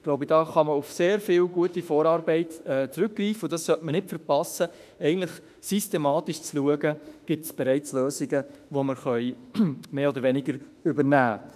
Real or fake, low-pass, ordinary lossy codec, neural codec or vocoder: fake; 14.4 kHz; none; autoencoder, 48 kHz, 32 numbers a frame, DAC-VAE, trained on Japanese speech